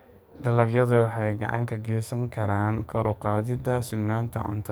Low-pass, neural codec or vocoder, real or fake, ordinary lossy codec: none; codec, 44.1 kHz, 2.6 kbps, SNAC; fake; none